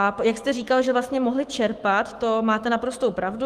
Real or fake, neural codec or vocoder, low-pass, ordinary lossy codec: fake; autoencoder, 48 kHz, 128 numbers a frame, DAC-VAE, trained on Japanese speech; 14.4 kHz; Opus, 24 kbps